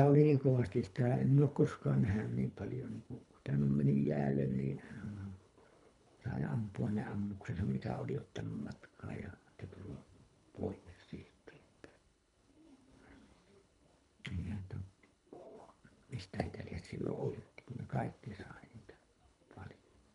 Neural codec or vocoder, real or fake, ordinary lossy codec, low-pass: codec, 24 kHz, 3 kbps, HILCodec; fake; none; 10.8 kHz